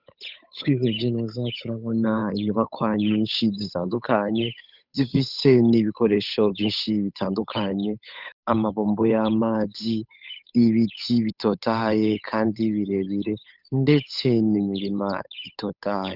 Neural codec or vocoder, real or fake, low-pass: codec, 16 kHz, 8 kbps, FunCodec, trained on Chinese and English, 25 frames a second; fake; 5.4 kHz